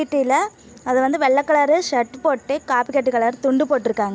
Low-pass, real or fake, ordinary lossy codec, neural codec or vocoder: none; real; none; none